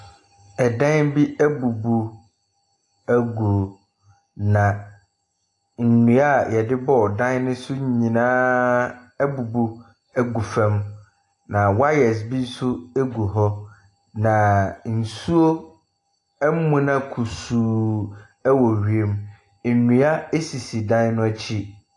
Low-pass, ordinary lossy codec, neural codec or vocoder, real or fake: 10.8 kHz; AAC, 48 kbps; none; real